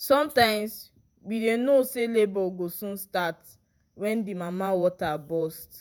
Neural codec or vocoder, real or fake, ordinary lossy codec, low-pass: vocoder, 48 kHz, 128 mel bands, Vocos; fake; none; none